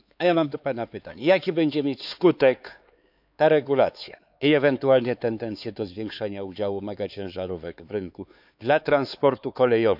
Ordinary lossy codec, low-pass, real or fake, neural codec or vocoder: AAC, 48 kbps; 5.4 kHz; fake; codec, 16 kHz, 4 kbps, X-Codec, HuBERT features, trained on LibriSpeech